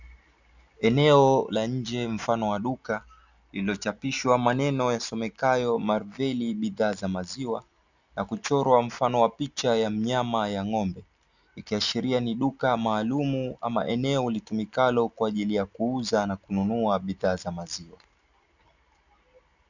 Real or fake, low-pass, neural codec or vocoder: real; 7.2 kHz; none